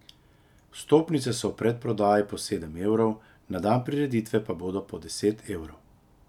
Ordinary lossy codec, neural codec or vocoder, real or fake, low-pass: none; none; real; 19.8 kHz